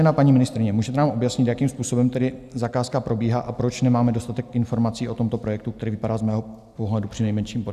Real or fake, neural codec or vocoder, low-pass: real; none; 14.4 kHz